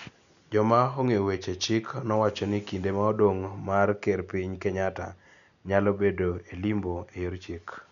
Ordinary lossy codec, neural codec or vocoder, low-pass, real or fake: none; none; 7.2 kHz; real